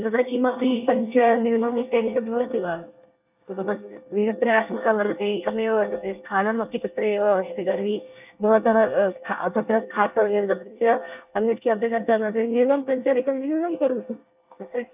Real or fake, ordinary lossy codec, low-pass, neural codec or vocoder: fake; none; 3.6 kHz; codec, 24 kHz, 1 kbps, SNAC